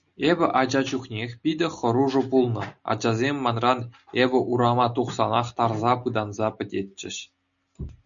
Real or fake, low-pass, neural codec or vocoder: real; 7.2 kHz; none